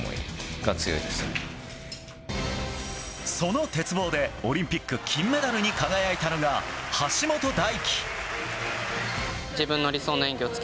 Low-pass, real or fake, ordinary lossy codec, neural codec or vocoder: none; real; none; none